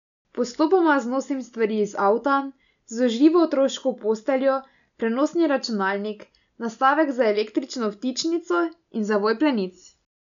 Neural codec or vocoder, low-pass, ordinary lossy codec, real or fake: none; 7.2 kHz; none; real